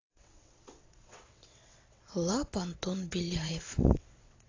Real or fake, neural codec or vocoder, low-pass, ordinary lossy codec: fake; vocoder, 22.05 kHz, 80 mel bands, WaveNeXt; 7.2 kHz; AAC, 32 kbps